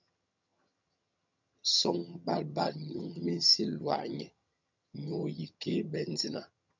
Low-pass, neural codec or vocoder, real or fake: 7.2 kHz; vocoder, 22.05 kHz, 80 mel bands, HiFi-GAN; fake